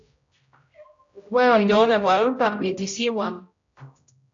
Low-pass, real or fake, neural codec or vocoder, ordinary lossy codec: 7.2 kHz; fake; codec, 16 kHz, 0.5 kbps, X-Codec, HuBERT features, trained on general audio; AAC, 48 kbps